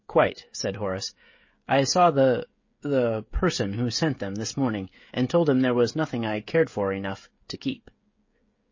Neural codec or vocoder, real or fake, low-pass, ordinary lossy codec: codec, 16 kHz, 16 kbps, FreqCodec, smaller model; fake; 7.2 kHz; MP3, 32 kbps